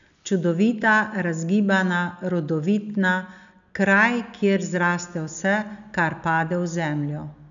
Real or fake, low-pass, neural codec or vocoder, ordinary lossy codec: real; 7.2 kHz; none; none